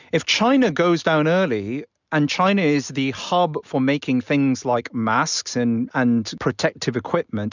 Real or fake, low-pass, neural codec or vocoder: real; 7.2 kHz; none